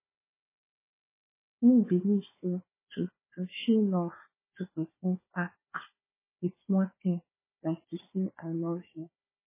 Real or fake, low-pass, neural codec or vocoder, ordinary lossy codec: fake; 3.6 kHz; codec, 16 kHz, 4 kbps, FunCodec, trained on Chinese and English, 50 frames a second; MP3, 16 kbps